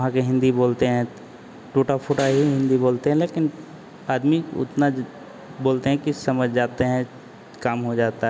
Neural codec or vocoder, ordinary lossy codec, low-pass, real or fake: none; none; none; real